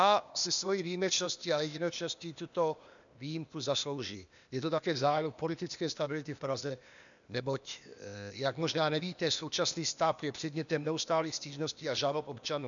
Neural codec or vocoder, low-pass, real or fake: codec, 16 kHz, 0.8 kbps, ZipCodec; 7.2 kHz; fake